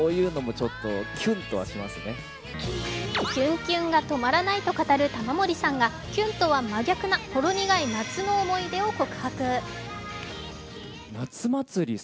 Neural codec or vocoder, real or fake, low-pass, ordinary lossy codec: none; real; none; none